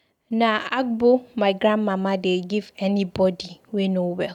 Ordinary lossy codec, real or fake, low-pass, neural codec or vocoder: none; real; 19.8 kHz; none